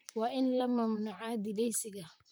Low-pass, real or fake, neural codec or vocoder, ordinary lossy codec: none; fake; vocoder, 44.1 kHz, 128 mel bands, Pupu-Vocoder; none